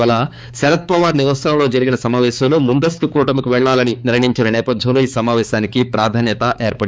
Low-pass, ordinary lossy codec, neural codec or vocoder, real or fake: none; none; codec, 16 kHz, 4 kbps, X-Codec, HuBERT features, trained on balanced general audio; fake